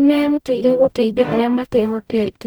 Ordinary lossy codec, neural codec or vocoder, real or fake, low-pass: none; codec, 44.1 kHz, 0.9 kbps, DAC; fake; none